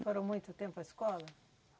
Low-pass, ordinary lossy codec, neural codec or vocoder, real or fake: none; none; none; real